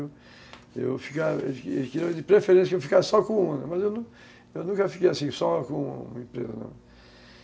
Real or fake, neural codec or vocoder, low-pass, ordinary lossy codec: real; none; none; none